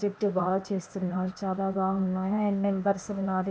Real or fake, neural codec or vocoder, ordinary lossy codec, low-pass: fake; codec, 16 kHz, 0.8 kbps, ZipCodec; none; none